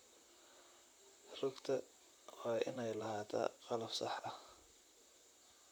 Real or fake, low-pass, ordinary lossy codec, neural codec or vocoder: fake; none; none; vocoder, 44.1 kHz, 128 mel bands, Pupu-Vocoder